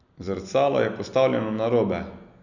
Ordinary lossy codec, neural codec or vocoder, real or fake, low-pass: none; none; real; 7.2 kHz